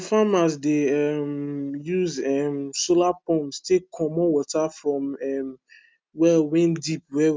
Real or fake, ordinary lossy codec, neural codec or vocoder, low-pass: real; none; none; none